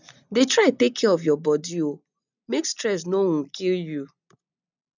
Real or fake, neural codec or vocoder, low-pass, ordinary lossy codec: real; none; 7.2 kHz; none